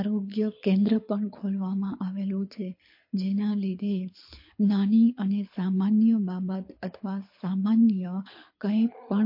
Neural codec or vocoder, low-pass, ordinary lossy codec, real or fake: codec, 24 kHz, 6 kbps, HILCodec; 5.4 kHz; MP3, 32 kbps; fake